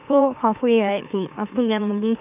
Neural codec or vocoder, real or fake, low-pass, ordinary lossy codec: autoencoder, 44.1 kHz, a latent of 192 numbers a frame, MeloTTS; fake; 3.6 kHz; none